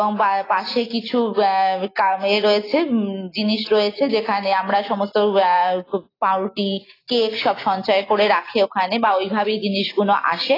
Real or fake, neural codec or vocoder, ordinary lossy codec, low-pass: real; none; AAC, 24 kbps; 5.4 kHz